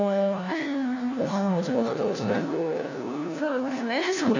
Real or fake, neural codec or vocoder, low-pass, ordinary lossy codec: fake; codec, 16 kHz, 1 kbps, FunCodec, trained on LibriTTS, 50 frames a second; 7.2 kHz; none